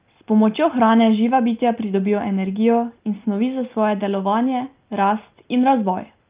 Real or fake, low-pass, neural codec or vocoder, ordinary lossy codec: real; 3.6 kHz; none; Opus, 24 kbps